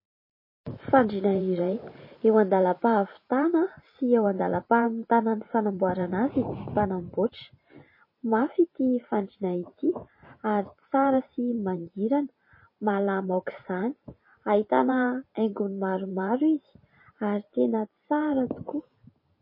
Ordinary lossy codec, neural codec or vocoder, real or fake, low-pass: MP3, 24 kbps; vocoder, 44.1 kHz, 128 mel bands every 512 samples, BigVGAN v2; fake; 5.4 kHz